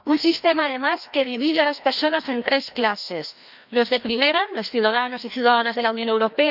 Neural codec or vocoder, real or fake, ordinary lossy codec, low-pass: codec, 16 kHz, 1 kbps, FreqCodec, larger model; fake; none; 5.4 kHz